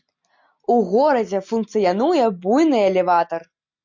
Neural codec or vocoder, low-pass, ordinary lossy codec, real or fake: none; 7.2 kHz; MP3, 64 kbps; real